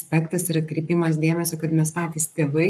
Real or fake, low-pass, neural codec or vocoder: fake; 14.4 kHz; codec, 44.1 kHz, 7.8 kbps, Pupu-Codec